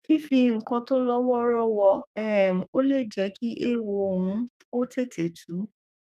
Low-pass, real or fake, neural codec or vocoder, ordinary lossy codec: 14.4 kHz; fake; codec, 32 kHz, 1.9 kbps, SNAC; none